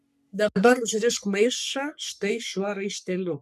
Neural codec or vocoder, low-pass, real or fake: codec, 44.1 kHz, 3.4 kbps, Pupu-Codec; 14.4 kHz; fake